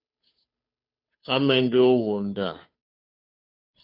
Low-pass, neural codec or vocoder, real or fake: 5.4 kHz; codec, 16 kHz, 2 kbps, FunCodec, trained on Chinese and English, 25 frames a second; fake